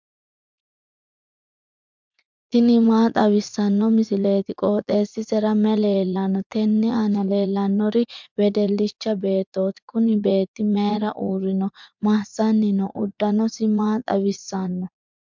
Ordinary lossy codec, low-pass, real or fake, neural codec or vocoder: MP3, 64 kbps; 7.2 kHz; fake; vocoder, 44.1 kHz, 80 mel bands, Vocos